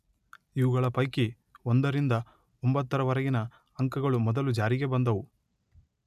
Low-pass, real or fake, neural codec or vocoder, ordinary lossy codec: 14.4 kHz; real; none; none